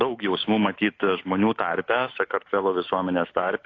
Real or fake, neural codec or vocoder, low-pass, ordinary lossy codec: fake; autoencoder, 48 kHz, 128 numbers a frame, DAC-VAE, trained on Japanese speech; 7.2 kHz; AAC, 32 kbps